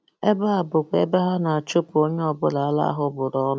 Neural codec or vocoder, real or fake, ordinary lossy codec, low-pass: none; real; none; none